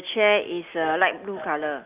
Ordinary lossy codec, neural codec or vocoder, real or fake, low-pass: Opus, 24 kbps; none; real; 3.6 kHz